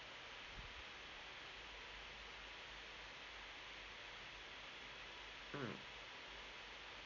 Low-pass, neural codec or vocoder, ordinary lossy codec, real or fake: 7.2 kHz; none; MP3, 48 kbps; real